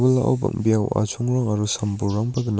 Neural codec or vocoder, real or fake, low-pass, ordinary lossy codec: none; real; none; none